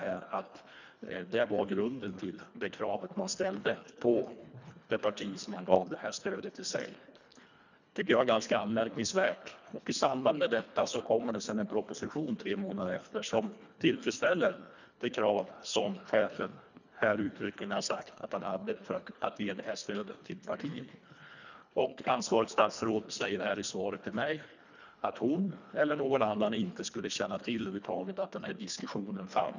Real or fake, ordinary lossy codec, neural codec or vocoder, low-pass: fake; none; codec, 24 kHz, 1.5 kbps, HILCodec; 7.2 kHz